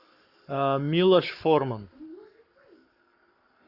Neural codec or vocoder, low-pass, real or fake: codec, 44.1 kHz, 7.8 kbps, Pupu-Codec; 5.4 kHz; fake